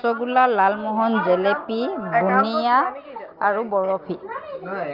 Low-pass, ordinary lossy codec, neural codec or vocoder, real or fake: 5.4 kHz; Opus, 24 kbps; none; real